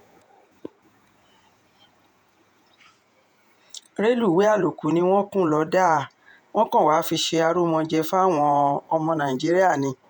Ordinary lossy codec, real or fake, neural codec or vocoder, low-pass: none; fake; vocoder, 48 kHz, 128 mel bands, Vocos; 19.8 kHz